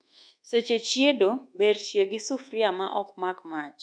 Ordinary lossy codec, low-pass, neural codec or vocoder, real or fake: none; 9.9 kHz; codec, 24 kHz, 1.2 kbps, DualCodec; fake